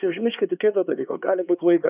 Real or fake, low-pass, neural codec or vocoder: fake; 3.6 kHz; codec, 16 kHz, 2 kbps, X-Codec, HuBERT features, trained on LibriSpeech